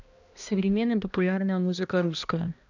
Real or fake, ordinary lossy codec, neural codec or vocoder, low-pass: fake; none; codec, 16 kHz, 1 kbps, X-Codec, HuBERT features, trained on balanced general audio; 7.2 kHz